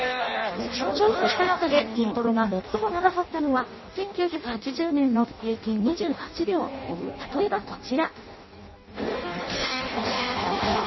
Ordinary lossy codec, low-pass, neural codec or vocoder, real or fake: MP3, 24 kbps; 7.2 kHz; codec, 16 kHz in and 24 kHz out, 0.6 kbps, FireRedTTS-2 codec; fake